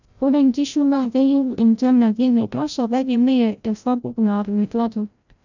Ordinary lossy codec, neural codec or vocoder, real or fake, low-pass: none; codec, 16 kHz, 0.5 kbps, FreqCodec, larger model; fake; 7.2 kHz